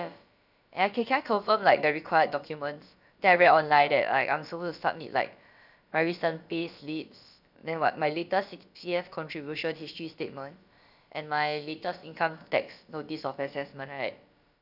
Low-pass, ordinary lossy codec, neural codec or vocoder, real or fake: 5.4 kHz; none; codec, 16 kHz, about 1 kbps, DyCAST, with the encoder's durations; fake